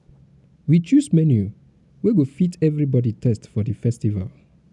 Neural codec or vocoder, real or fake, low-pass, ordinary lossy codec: none; real; 10.8 kHz; none